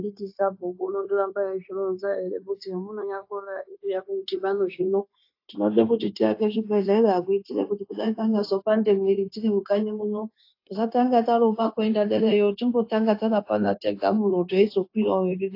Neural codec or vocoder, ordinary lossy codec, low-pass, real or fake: codec, 16 kHz, 0.9 kbps, LongCat-Audio-Codec; AAC, 32 kbps; 5.4 kHz; fake